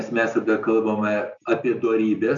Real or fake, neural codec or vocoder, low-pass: real; none; 7.2 kHz